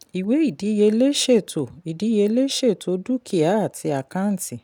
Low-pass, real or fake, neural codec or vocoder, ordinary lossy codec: 19.8 kHz; real; none; none